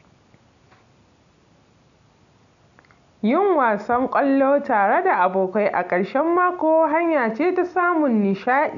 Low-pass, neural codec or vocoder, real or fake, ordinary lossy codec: 7.2 kHz; none; real; none